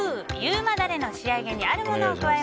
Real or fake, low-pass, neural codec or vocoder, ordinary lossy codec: real; none; none; none